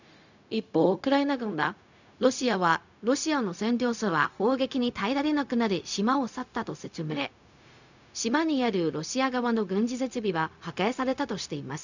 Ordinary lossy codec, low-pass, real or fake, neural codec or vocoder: none; 7.2 kHz; fake; codec, 16 kHz, 0.4 kbps, LongCat-Audio-Codec